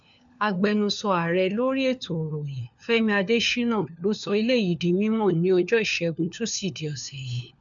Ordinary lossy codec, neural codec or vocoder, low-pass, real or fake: none; codec, 16 kHz, 4 kbps, FunCodec, trained on LibriTTS, 50 frames a second; 7.2 kHz; fake